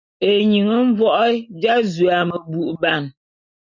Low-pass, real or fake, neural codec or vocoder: 7.2 kHz; real; none